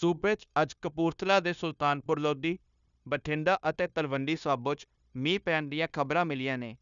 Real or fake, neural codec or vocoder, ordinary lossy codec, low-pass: fake; codec, 16 kHz, 0.9 kbps, LongCat-Audio-Codec; none; 7.2 kHz